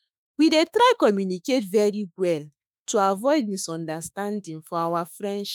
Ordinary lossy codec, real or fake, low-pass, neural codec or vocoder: none; fake; none; autoencoder, 48 kHz, 32 numbers a frame, DAC-VAE, trained on Japanese speech